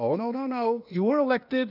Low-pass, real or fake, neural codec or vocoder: 5.4 kHz; fake; codec, 16 kHz, 0.8 kbps, ZipCodec